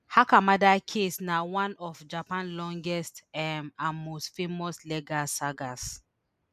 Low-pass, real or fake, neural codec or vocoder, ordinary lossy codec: 14.4 kHz; real; none; none